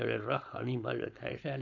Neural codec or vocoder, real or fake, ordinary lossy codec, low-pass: codec, 16 kHz, 4.8 kbps, FACodec; fake; none; 7.2 kHz